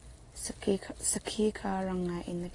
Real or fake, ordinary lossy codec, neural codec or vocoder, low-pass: real; AAC, 32 kbps; none; 10.8 kHz